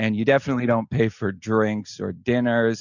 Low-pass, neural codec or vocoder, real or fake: 7.2 kHz; none; real